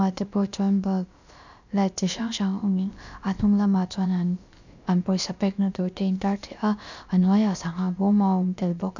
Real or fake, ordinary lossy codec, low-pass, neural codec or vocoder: fake; none; 7.2 kHz; codec, 16 kHz, about 1 kbps, DyCAST, with the encoder's durations